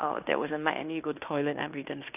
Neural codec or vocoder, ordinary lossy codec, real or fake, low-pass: codec, 16 kHz in and 24 kHz out, 0.9 kbps, LongCat-Audio-Codec, fine tuned four codebook decoder; none; fake; 3.6 kHz